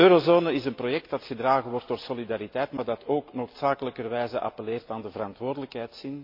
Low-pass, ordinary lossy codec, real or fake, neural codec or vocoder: 5.4 kHz; MP3, 32 kbps; real; none